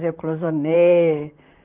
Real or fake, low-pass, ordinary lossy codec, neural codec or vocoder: fake; 3.6 kHz; Opus, 32 kbps; vocoder, 44.1 kHz, 128 mel bands, Pupu-Vocoder